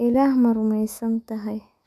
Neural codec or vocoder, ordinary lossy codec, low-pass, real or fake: autoencoder, 48 kHz, 128 numbers a frame, DAC-VAE, trained on Japanese speech; none; 14.4 kHz; fake